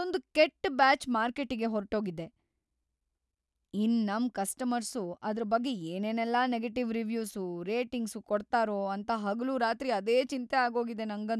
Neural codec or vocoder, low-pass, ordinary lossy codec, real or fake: none; none; none; real